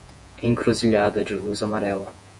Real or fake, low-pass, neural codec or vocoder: fake; 10.8 kHz; vocoder, 48 kHz, 128 mel bands, Vocos